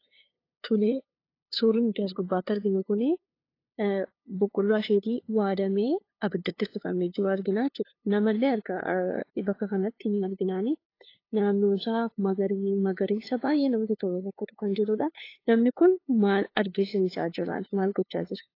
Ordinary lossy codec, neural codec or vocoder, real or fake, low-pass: AAC, 32 kbps; codec, 16 kHz, 2 kbps, FunCodec, trained on LibriTTS, 25 frames a second; fake; 5.4 kHz